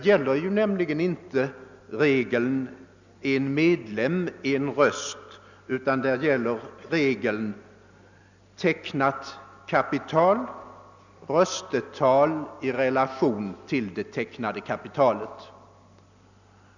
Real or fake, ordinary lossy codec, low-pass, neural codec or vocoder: real; none; 7.2 kHz; none